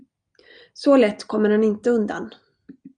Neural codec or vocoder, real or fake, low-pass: none; real; 9.9 kHz